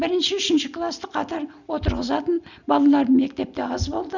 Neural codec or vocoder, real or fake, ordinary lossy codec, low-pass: none; real; none; 7.2 kHz